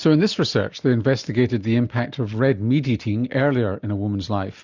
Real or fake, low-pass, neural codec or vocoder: real; 7.2 kHz; none